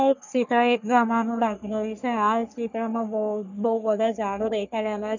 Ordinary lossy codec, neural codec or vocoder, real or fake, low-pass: none; codec, 44.1 kHz, 3.4 kbps, Pupu-Codec; fake; 7.2 kHz